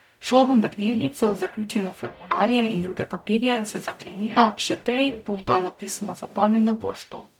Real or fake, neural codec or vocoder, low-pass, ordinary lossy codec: fake; codec, 44.1 kHz, 0.9 kbps, DAC; 19.8 kHz; none